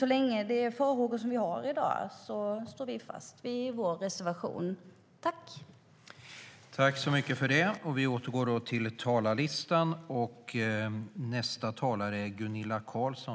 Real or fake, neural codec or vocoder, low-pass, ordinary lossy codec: real; none; none; none